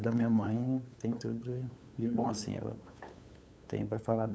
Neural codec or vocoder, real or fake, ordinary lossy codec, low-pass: codec, 16 kHz, 8 kbps, FunCodec, trained on LibriTTS, 25 frames a second; fake; none; none